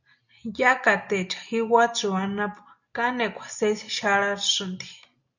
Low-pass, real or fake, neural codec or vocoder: 7.2 kHz; real; none